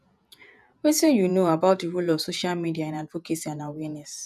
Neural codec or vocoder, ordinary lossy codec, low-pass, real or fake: vocoder, 48 kHz, 128 mel bands, Vocos; none; 14.4 kHz; fake